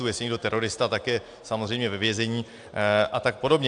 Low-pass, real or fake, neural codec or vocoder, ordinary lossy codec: 9.9 kHz; real; none; AAC, 64 kbps